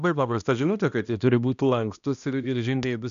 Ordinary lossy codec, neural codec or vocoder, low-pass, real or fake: MP3, 96 kbps; codec, 16 kHz, 1 kbps, X-Codec, HuBERT features, trained on balanced general audio; 7.2 kHz; fake